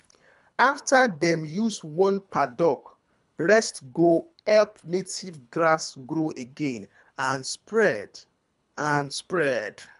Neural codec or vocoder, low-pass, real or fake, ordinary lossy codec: codec, 24 kHz, 3 kbps, HILCodec; 10.8 kHz; fake; MP3, 96 kbps